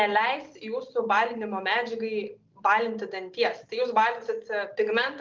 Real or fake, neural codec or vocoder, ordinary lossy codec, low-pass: real; none; Opus, 32 kbps; 7.2 kHz